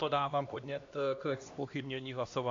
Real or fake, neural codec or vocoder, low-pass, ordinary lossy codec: fake; codec, 16 kHz, 1 kbps, X-Codec, HuBERT features, trained on LibriSpeech; 7.2 kHz; AAC, 48 kbps